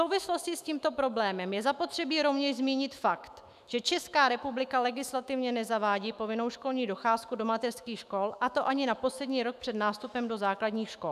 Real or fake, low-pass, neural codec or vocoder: fake; 14.4 kHz; autoencoder, 48 kHz, 128 numbers a frame, DAC-VAE, trained on Japanese speech